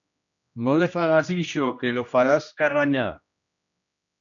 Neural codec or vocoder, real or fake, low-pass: codec, 16 kHz, 1 kbps, X-Codec, HuBERT features, trained on general audio; fake; 7.2 kHz